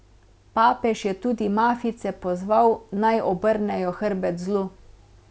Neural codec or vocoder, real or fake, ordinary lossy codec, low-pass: none; real; none; none